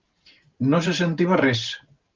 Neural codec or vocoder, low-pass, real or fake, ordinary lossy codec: vocoder, 44.1 kHz, 128 mel bands every 512 samples, BigVGAN v2; 7.2 kHz; fake; Opus, 32 kbps